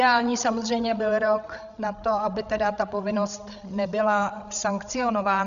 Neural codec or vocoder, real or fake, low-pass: codec, 16 kHz, 8 kbps, FreqCodec, larger model; fake; 7.2 kHz